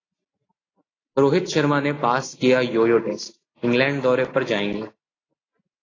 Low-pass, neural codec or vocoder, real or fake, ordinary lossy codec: 7.2 kHz; none; real; AAC, 32 kbps